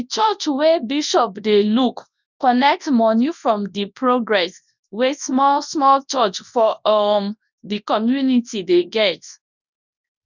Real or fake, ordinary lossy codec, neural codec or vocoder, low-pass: fake; none; codec, 24 kHz, 0.9 kbps, WavTokenizer, large speech release; 7.2 kHz